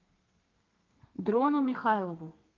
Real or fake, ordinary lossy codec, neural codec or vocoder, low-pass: fake; Opus, 24 kbps; codec, 32 kHz, 1.9 kbps, SNAC; 7.2 kHz